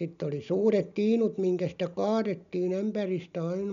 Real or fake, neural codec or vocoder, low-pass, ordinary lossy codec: real; none; 7.2 kHz; none